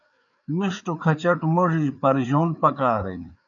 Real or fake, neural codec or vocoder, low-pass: fake; codec, 16 kHz, 4 kbps, FreqCodec, larger model; 7.2 kHz